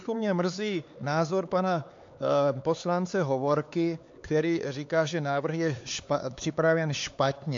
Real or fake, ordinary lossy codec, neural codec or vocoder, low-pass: fake; AAC, 48 kbps; codec, 16 kHz, 4 kbps, X-Codec, HuBERT features, trained on LibriSpeech; 7.2 kHz